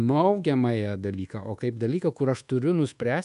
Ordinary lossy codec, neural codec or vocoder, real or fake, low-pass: AAC, 96 kbps; codec, 24 kHz, 1.2 kbps, DualCodec; fake; 10.8 kHz